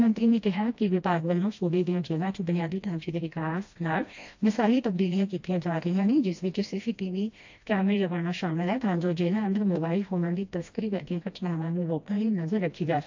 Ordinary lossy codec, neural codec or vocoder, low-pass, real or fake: AAC, 48 kbps; codec, 16 kHz, 1 kbps, FreqCodec, smaller model; 7.2 kHz; fake